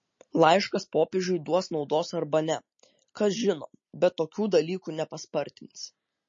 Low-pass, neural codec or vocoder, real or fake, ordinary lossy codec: 7.2 kHz; none; real; MP3, 32 kbps